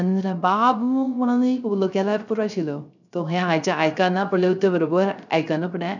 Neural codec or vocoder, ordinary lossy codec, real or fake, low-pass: codec, 16 kHz, 0.3 kbps, FocalCodec; none; fake; 7.2 kHz